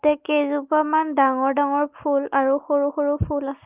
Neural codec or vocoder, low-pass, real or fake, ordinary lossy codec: none; 3.6 kHz; real; Opus, 32 kbps